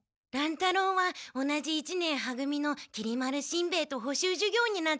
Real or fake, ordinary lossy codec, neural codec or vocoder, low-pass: real; none; none; none